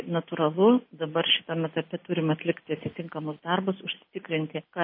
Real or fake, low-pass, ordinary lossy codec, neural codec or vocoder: real; 5.4 kHz; MP3, 24 kbps; none